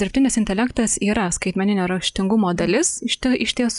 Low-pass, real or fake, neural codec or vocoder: 10.8 kHz; real; none